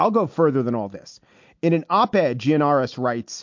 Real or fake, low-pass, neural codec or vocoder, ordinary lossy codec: real; 7.2 kHz; none; MP3, 48 kbps